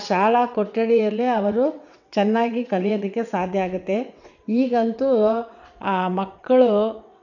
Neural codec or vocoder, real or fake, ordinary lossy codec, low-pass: vocoder, 22.05 kHz, 80 mel bands, WaveNeXt; fake; none; 7.2 kHz